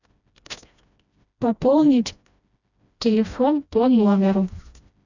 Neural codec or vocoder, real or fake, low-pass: codec, 16 kHz, 1 kbps, FreqCodec, smaller model; fake; 7.2 kHz